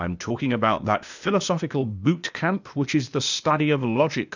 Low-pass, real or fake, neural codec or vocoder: 7.2 kHz; fake; codec, 16 kHz, 0.8 kbps, ZipCodec